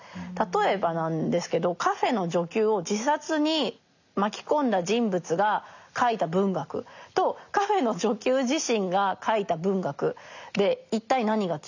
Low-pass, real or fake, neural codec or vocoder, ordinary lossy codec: 7.2 kHz; real; none; none